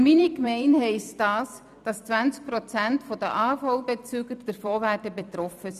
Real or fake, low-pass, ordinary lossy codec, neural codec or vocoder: fake; 14.4 kHz; none; vocoder, 44.1 kHz, 128 mel bands every 256 samples, BigVGAN v2